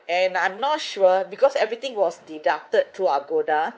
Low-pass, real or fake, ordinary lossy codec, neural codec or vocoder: none; fake; none; codec, 16 kHz, 4 kbps, X-Codec, WavLM features, trained on Multilingual LibriSpeech